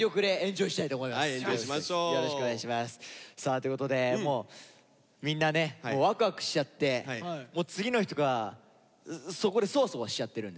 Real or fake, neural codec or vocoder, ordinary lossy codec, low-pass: real; none; none; none